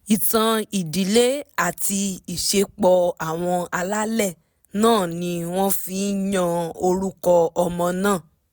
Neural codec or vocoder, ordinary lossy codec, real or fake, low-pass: none; none; real; none